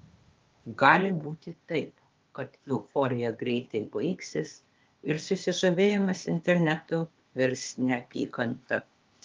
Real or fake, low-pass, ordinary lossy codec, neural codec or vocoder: fake; 7.2 kHz; Opus, 32 kbps; codec, 16 kHz, 0.8 kbps, ZipCodec